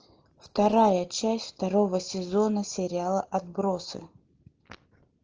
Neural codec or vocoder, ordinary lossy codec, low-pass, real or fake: none; Opus, 16 kbps; 7.2 kHz; real